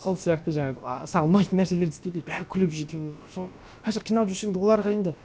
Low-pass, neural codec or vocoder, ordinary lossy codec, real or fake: none; codec, 16 kHz, about 1 kbps, DyCAST, with the encoder's durations; none; fake